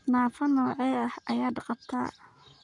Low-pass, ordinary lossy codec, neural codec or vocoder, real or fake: 10.8 kHz; none; codec, 44.1 kHz, 7.8 kbps, Pupu-Codec; fake